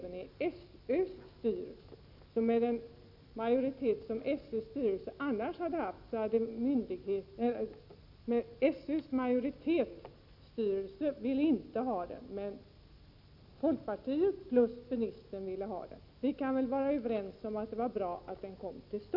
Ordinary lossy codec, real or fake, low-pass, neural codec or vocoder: none; real; 5.4 kHz; none